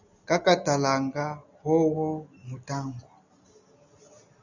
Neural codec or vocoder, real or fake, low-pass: none; real; 7.2 kHz